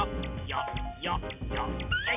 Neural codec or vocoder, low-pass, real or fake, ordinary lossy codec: none; 3.6 kHz; real; none